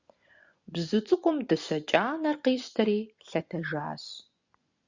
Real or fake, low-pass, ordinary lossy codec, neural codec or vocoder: fake; 7.2 kHz; Opus, 64 kbps; vocoder, 24 kHz, 100 mel bands, Vocos